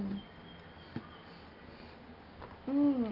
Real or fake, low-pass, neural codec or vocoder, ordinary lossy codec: real; 5.4 kHz; none; Opus, 32 kbps